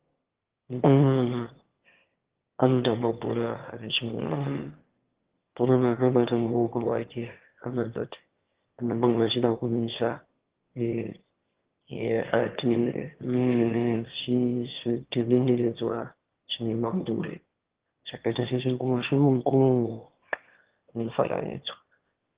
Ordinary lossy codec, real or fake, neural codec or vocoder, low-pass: Opus, 16 kbps; fake; autoencoder, 22.05 kHz, a latent of 192 numbers a frame, VITS, trained on one speaker; 3.6 kHz